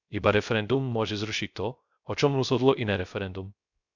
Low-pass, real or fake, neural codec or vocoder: 7.2 kHz; fake; codec, 16 kHz, 0.3 kbps, FocalCodec